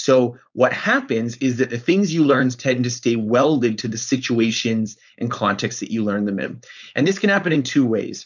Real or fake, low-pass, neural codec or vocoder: fake; 7.2 kHz; codec, 16 kHz, 4.8 kbps, FACodec